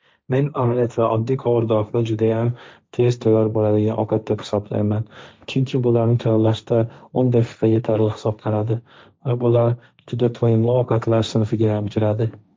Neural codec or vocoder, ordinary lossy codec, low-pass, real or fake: codec, 16 kHz, 1.1 kbps, Voila-Tokenizer; none; none; fake